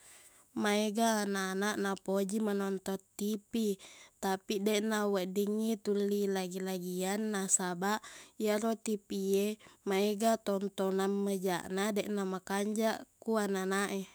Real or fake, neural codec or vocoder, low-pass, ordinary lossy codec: fake; autoencoder, 48 kHz, 128 numbers a frame, DAC-VAE, trained on Japanese speech; none; none